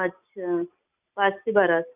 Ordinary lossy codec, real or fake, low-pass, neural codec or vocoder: none; real; 3.6 kHz; none